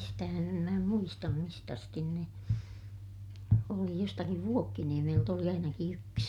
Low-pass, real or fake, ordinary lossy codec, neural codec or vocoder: 19.8 kHz; real; none; none